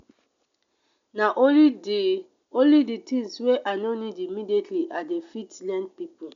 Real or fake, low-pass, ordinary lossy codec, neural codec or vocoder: real; 7.2 kHz; MP3, 64 kbps; none